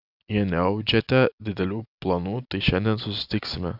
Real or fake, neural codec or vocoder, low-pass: real; none; 5.4 kHz